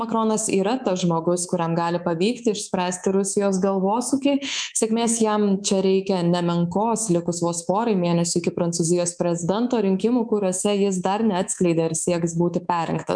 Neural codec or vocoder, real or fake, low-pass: codec, 24 kHz, 3.1 kbps, DualCodec; fake; 9.9 kHz